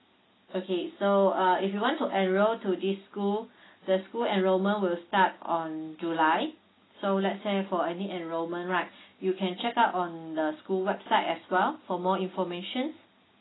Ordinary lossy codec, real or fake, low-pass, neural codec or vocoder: AAC, 16 kbps; real; 7.2 kHz; none